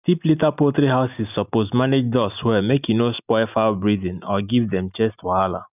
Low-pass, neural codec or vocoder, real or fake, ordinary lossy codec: 3.6 kHz; none; real; none